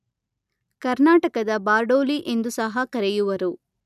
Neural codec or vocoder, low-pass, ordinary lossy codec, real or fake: none; 14.4 kHz; none; real